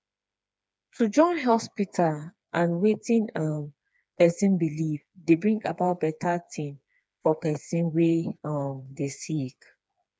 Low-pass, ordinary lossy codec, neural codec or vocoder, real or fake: none; none; codec, 16 kHz, 4 kbps, FreqCodec, smaller model; fake